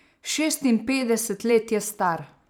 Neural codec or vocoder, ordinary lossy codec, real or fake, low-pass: vocoder, 44.1 kHz, 128 mel bands every 512 samples, BigVGAN v2; none; fake; none